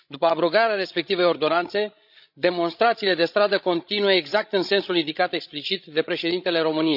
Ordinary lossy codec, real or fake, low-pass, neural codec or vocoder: none; fake; 5.4 kHz; codec, 16 kHz, 16 kbps, FreqCodec, larger model